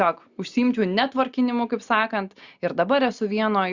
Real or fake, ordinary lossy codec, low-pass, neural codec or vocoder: real; Opus, 64 kbps; 7.2 kHz; none